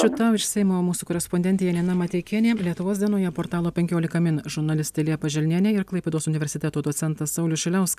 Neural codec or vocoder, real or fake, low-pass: none; real; 14.4 kHz